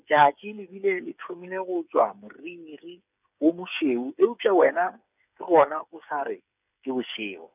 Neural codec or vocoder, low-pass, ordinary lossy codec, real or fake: codec, 16 kHz, 8 kbps, FreqCodec, smaller model; 3.6 kHz; none; fake